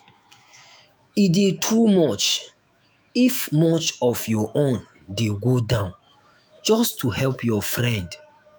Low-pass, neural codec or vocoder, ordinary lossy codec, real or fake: none; autoencoder, 48 kHz, 128 numbers a frame, DAC-VAE, trained on Japanese speech; none; fake